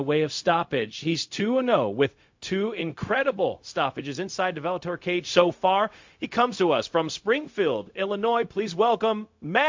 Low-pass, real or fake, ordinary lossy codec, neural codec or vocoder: 7.2 kHz; fake; MP3, 48 kbps; codec, 16 kHz, 0.4 kbps, LongCat-Audio-Codec